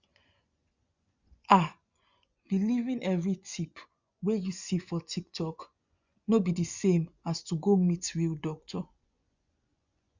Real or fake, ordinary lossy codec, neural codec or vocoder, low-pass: fake; Opus, 64 kbps; vocoder, 24 kHz, 100 mel bands, Vocos; 7.2 kHz